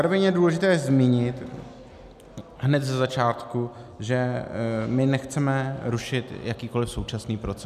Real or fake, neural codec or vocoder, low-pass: real; none; 14.4 kHz